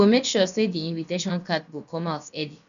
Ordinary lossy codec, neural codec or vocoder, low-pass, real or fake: none; codec, 16 kHz, about 1 kbps, DyCAST, with the encoder's durations; 7.2 kHz; fake